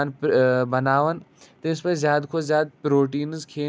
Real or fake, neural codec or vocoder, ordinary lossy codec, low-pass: real; none; none; none